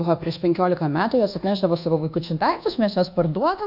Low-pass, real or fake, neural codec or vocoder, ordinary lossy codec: 5.4 kHz; fake; codec, 24 kHz, 1.2 kbps, DualCodec; AAC, 48 kbps